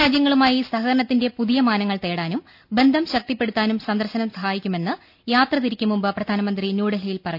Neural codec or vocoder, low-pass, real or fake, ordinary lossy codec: none; 5.4 kHz; real; none